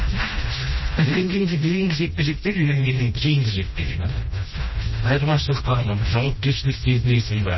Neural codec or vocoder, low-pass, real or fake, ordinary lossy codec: codec, 16 kHz, 1 kbps, FreqCodec, smaller model; 7.2 kHz; fake; MP3, 24 kbps